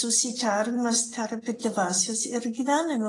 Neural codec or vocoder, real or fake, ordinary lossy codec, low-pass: none; real; AAC, 32 kbps; 10.8 kHz